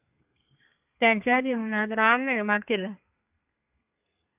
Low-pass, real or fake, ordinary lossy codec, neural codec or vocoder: 3.6 kHz; fake; AAC, 32 kbps; codec, 32 kHz, 1.9 kbps, SNAC